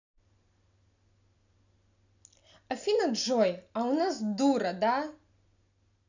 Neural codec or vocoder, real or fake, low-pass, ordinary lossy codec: none; real; 7.2 kHz; none